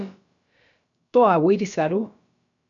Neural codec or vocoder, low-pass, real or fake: codec, 16 kHz, about 1 kbps, DyCAST, with the encoder's durations; 7.2 kHz; fake